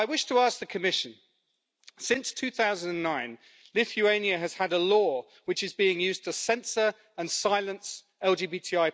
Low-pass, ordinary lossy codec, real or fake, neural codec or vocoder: none; none; real; none